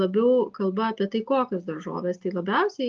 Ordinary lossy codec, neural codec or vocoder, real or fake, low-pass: Opus, 32 kbps; none; real; 7.2 kHz